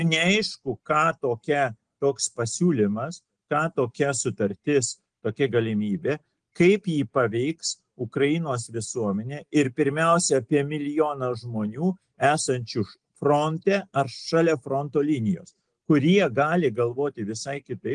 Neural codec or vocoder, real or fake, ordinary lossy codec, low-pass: none; real; Opus, 24 kbps; 9.9 kHz